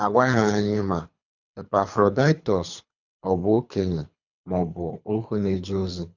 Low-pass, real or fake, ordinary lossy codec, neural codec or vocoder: 7.2 kHz; fake; none; codec, 24 kHz, 3 kbps, HILCodec